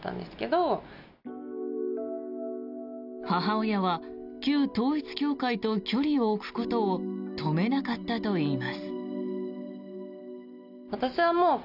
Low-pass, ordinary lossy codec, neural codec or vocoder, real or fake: 5.4 kHz; none; none; real